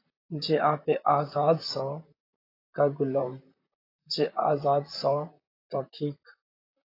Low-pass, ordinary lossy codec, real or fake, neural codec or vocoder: 5.4 kHz; AAC, 24 kbps; fake; vocoder, 44.1 kHz, 128 mel bands, Pupu-Vocoder